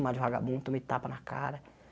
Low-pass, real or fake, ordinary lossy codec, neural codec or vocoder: none; real; none; none